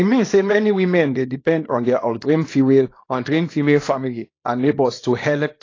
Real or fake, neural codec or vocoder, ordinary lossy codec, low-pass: fake; codec, 24 kHz, 0.9 kbps, WavTokenizer, small release; AAC, 32 kbps; 7.2 kHz